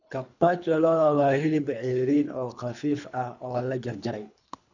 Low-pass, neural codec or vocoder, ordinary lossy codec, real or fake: 7.2 kHz; codec, 24 kHz, 3 kbps, HILCodec; none; fake